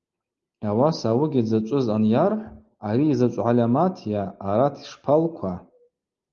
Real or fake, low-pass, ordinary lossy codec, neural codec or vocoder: real; 7.2 kHz; Opus, 32 kbps; none